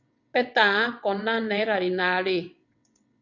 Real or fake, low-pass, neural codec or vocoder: fake; 7.2 kHz; vocoder, 22.05 kHz, 80 mel bands, WaveNeXt